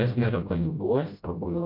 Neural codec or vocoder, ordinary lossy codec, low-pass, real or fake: codec, 16 kHz, 0.5 kbps, FreqCodec, smaller model; MP3, 48 kbps; 5.4 kHz; fake